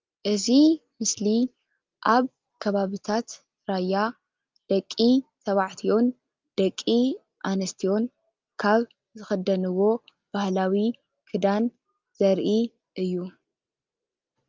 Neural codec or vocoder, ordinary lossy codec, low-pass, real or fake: none; Opus, 24 kbps; 7.2 kHz; real